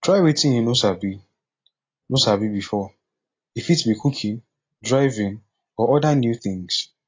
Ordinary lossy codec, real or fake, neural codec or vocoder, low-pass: AAC, 32 kbps; real; none; 7.2 kHz